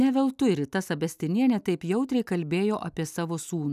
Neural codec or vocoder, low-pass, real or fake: none; 14.4 kHz; real